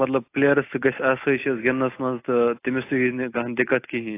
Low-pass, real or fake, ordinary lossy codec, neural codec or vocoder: 3.6 kHz; real; AAC, 24 kbps; none